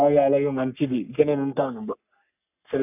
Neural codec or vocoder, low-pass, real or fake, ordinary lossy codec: codec, 44.1 kHz, 3.4 kbps, Pupu-Codec; 3.6 kHz; fake; none